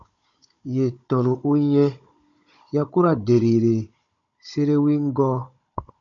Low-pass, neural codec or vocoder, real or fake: 7.2 kHz; codec, 16 kHz, 16 kbps, FunCodec, trained on Chinese and English, 50 frames a second; fake